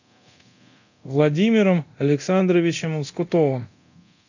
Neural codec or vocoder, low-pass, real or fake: codec, 24 kHz, 0.9 kbps, DualCodec; 7.2 kHz; fake